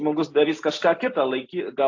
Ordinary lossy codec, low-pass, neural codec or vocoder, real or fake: AAC, 48 kbps; 7.2 kHz; none; real